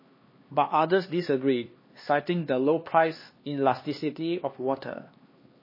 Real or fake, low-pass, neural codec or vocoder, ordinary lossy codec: fake; 5.4 kHz; codec, 16 kHz, 2 kbps, X-Codec, HuBERT features, trained on LibriSpeech; MP3, 24 kbps